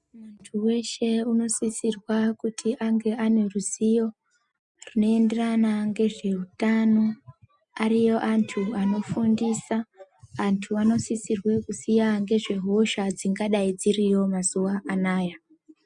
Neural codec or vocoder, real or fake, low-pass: none; real; 10.8 kHz